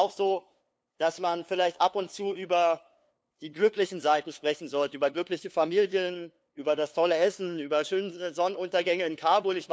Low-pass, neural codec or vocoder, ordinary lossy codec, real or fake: none; codec, 16 kHz, 2 kbps, FunCodec, trained on LibriTTS, 25 frames a second; none; fake